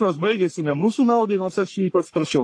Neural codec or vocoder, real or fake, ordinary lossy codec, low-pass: codec, 44.1 kHz, 1.7 kbps, Pupu-Codec; fake; AAC, 48 kbps; 9.9 kHz